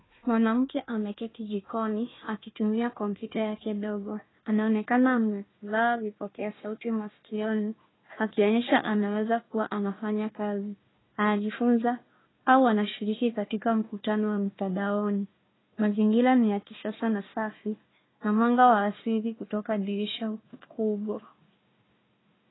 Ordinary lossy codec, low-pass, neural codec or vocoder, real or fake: AAC, 16 kbps; 7.2 kHz; codec, 16 kHz, 1 kbps, FunCodec, trained on Chinese and English, 50 frames a second; fake